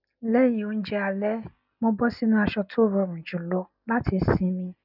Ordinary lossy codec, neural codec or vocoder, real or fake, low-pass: none; none; real; 5.4 kHz